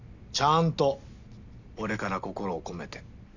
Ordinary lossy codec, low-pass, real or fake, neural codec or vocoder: none; 7.2 kHz; real; none